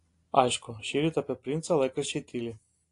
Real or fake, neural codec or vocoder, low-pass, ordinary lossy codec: real; none; 10.8 kHz; AAC, 48 kbps